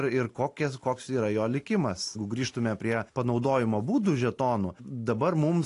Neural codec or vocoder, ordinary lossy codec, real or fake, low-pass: none; AAC, 48 kbps; real; 10.8 kHz